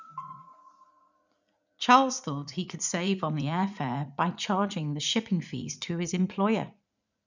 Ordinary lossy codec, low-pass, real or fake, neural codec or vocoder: none; 7.2 kHz; fake; vocoder, 44.1 kHz, 80 mel bands, Vocos